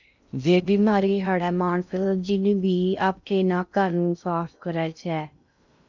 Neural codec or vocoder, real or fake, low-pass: codec, 16 kHz in and 24 kHz out, 0.6 kbps, FocalCodec, streaming, 2048 codes; fake; 7.2 kHz